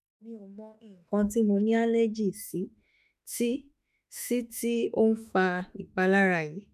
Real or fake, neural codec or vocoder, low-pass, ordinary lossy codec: fake; autoencoder, 48 kHz, 32 numbers a frame, DAC-VAE, trained on Japanese speech; 14.4 kHz; none